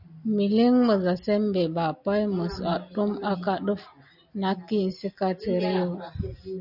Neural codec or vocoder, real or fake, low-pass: none; real; 5.4 kHz